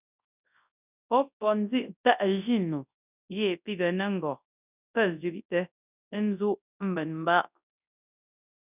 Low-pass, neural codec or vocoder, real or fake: 3.6 kHz; codec, 24 kHz, 0.9 kbps, WavTokenizer, large speech release; fake